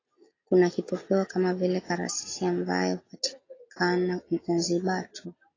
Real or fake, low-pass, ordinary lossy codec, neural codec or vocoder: real; 7.2 kHz; AAC, 32 kbps; none